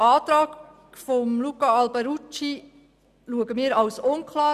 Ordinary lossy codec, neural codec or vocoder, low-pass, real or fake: none; none; 14.4 kHz; real